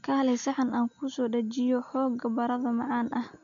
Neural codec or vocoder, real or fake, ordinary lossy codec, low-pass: none; real; none; 7.2 kHz